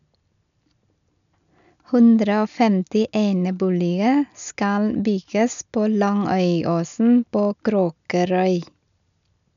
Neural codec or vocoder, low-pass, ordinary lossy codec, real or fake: none; 7.2 kHz; none; real